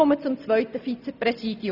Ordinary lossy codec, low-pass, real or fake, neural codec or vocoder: none; 5.4 kHz; real; none